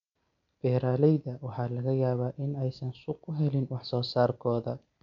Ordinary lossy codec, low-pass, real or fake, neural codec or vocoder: MP3, 64 kbps; 7.2 kHz; real; none